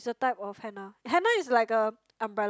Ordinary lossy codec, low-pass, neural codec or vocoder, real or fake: none; none; none; real